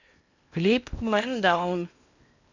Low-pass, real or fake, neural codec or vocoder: 7.2 kHz; fake; codec, 16 kHz in and 24 kHz out, 0.8 kbps, FocalCodec, streaming, 65536 codes